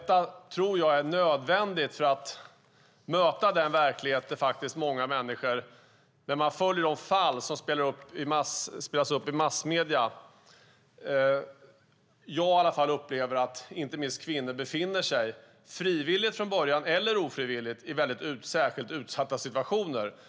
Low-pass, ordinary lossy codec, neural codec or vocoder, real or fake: none; none; none; real